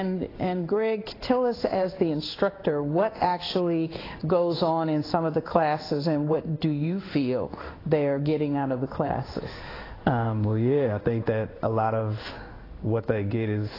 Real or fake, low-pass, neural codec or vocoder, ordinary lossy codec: fake; 5.4 kHz; codec, 16 kHz in and 24 kHz out, 1 kbps, XY-Tokenizer; AAC, 24 kbps